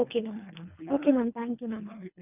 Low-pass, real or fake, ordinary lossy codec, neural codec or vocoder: 3.6 kHz; fake; none; codec, 24 kHz, 3 kbps, HILCodec